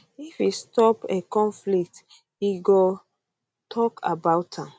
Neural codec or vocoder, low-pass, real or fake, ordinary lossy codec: none; none; real; none